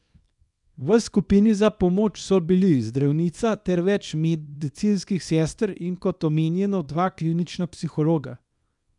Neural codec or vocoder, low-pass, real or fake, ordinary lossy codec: codec, 24 kHz, 0.9 kbps, WavTokenizer, small release; 10.8 kHz; fake; none